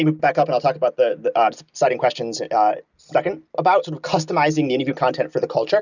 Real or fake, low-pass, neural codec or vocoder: real; 7.2 kHz; none